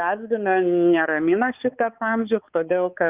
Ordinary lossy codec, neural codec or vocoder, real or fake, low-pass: Opus, 24 kbps; codec, 16 kHz, 4 kbps, X-Codec, HuBERT features, trained on balanced general audio; fake; 3.6 kHz